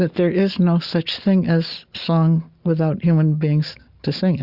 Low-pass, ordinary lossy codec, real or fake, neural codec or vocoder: 5.4 kHz; Opus, 64 kbps; real; none